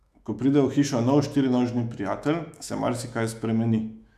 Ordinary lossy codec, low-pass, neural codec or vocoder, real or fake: none; 14.4 kHz; autoencoder, 48 kHz, 128 numbers a frame, DAC-VAE, trained on Japanese speech; fake